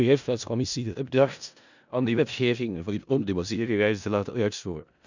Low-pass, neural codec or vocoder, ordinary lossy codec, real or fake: 7.2 kHz; codec, 16 kHz in and 24 kHz out, 0.4 kbps, LongCat-Audio-Codec, four codebook decoder; none; fake